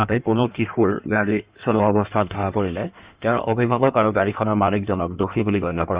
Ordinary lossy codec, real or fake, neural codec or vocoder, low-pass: Opus, 64 kbps; fake; codec, 16 kHz in and 24 kHz out, 1.1 kbps, FireRedTTS-2 codec; 3.6 kHz